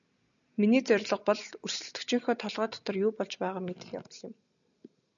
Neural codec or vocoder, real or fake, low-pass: none; real; 7.2 kHz